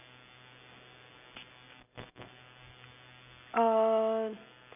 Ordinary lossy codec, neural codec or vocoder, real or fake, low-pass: none; none; real; 3.6 kHz